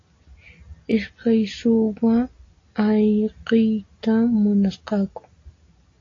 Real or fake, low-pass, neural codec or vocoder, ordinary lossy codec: real; 7.2 kHz; none; AAC, 32 kbps